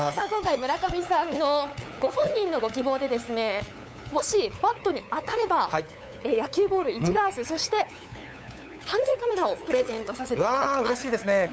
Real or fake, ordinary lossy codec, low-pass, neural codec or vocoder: fake; none; none; codec, 16 kHz, 8 kbps, FunCodec, trained on LibriTTS, 25 frames a second